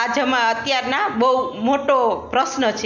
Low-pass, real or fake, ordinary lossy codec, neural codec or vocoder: 7.2 kHz; real; MP3, 64 kbps; none